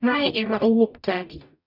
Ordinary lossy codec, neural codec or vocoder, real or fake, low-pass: none; codec, 44.1 kHz, 0.9 kbps, DAC; fake; 5.4 kHz